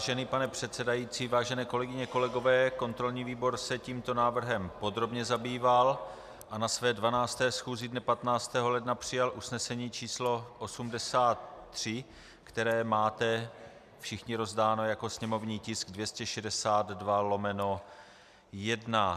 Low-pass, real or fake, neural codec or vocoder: 14.4 kHz; real; none